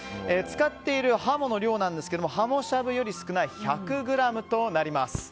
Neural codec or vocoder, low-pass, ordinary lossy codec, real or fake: none; none; none; real